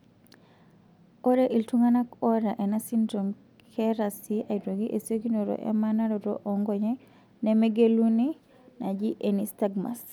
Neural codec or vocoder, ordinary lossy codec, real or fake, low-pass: none; none; real; none